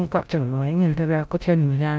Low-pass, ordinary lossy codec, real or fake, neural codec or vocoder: none; none; fake; codec, 16 kHz, 0.5 kbps, FreqCodec, larger model